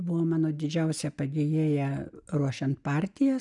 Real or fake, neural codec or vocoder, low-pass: real; none; 10.8 kHz